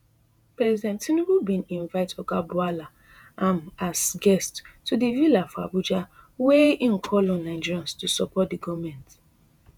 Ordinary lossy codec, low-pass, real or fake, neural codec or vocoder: none; none; fake; vocoder, 48 kHz, 128 mel bands, Vocos